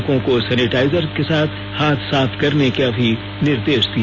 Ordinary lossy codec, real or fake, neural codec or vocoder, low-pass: none; real; none; 7.2 kHz